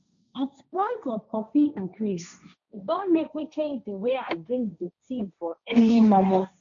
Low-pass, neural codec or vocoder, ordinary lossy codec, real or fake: 7.2 kHz; codec, 16 kHz, 1.1 kbps, Voila-Tokenizer; none; fake